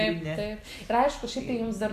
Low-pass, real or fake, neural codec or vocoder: 14.4 kHz; real; none